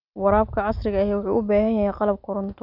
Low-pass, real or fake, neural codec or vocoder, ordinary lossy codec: 5.4 kHz; real; none; none